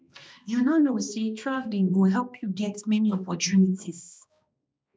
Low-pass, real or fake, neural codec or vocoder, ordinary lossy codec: none; fake; codec, 16 kHz, 1 kbps, X-Codec, HuBERT features, trained on balanced general audio; none